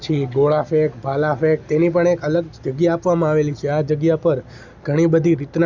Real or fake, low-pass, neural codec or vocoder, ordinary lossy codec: real; 7.2 kHz; none; Opus, 64 kbps